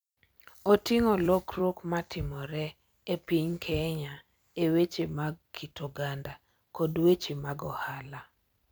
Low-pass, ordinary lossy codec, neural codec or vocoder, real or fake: none; none; vocoder, 44.1 kHz, 128 mel bands every 256 samples, BigVGAN v2; fake